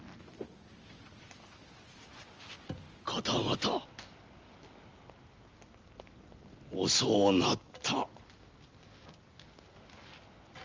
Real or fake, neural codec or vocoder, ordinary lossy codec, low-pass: real; none; Opus, 24 kbps; 7.2 kHz